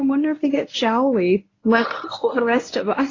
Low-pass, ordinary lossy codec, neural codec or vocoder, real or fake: 7.2 kHz; AAC, 32 kbps; codec, 24 kHz, 0.9 kbps, WavTokenizer, medium speech release version 1; fake